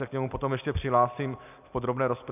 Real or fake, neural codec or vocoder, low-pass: real; none; 3.6 kHz